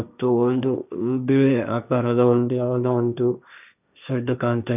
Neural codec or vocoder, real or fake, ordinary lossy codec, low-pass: codec, 16 kHz, 1.1 kbps, Voila-Tokenizer; fake; none; 3.6 kHz